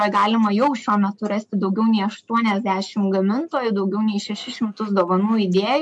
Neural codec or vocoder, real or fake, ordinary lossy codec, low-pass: none; real; MP3, 64 kbps; 10.8 kHz